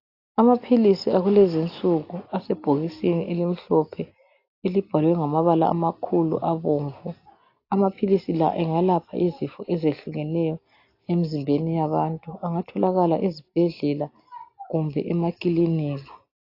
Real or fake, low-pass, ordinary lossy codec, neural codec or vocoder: real; 5.4 kHz; AAC, 32 kbps; none